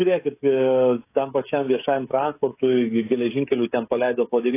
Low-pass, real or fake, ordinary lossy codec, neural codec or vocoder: 3.6 kHz; fake; AAC, 24 kbps; codec, 16 kHz, 16 kbps, FreqCodec, smaller model